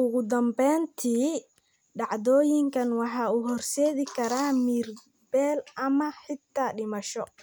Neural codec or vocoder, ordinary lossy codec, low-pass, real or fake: none; none; none; real